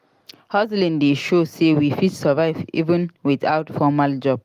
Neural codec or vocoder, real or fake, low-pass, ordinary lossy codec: none; real; 14.4 kHz; Opus, 32 kbps